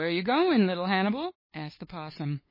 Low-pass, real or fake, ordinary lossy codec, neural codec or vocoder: 5.4 kHz; fake; MP3, 24 kbps; codec, 16 kHz, 8 kbps, FunCodec, trained on LibriTTS, 25 frames a second